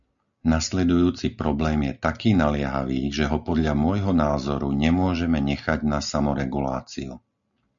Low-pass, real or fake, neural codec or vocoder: 7.2 kHz; real; none